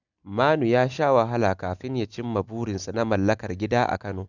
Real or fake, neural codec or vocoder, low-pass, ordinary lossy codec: real; none; 7.2 kHz; none